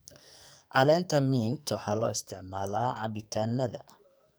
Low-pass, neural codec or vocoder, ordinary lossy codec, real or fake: none; codec, 44.1 kHz, 2.6 kbps, SNAC; none; fake